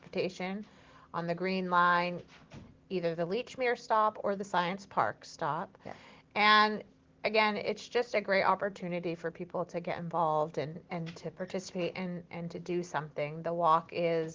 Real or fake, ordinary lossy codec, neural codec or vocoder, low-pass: real; Opus, 16 kbps; none; 7.2 kHz